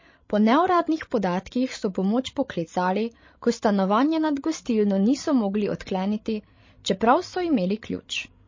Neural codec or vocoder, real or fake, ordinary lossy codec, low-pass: codec, 16 kHz, 16 kbps, FreqCodec, larger model; fake; MP3, 32 kbps; 7.2 kHz